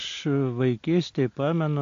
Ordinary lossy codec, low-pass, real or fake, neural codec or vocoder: AAC, 48 kbps; 7.2 kHz; real; none